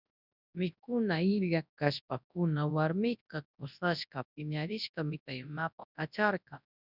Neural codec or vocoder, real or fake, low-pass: codec, 24 kHz, 0.9 kbps, WavTokenizer, large speech release; fake; 5.4 kHz